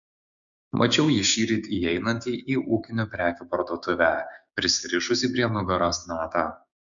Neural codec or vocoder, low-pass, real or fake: codec, 16 kHz, 6 kbps, DAC; 7.2 kHz; fake